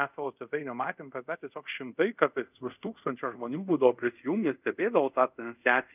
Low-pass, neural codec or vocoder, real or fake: 3.6 kHz; codec, 24 kHz, 0.5 kbps, DualCodec; fake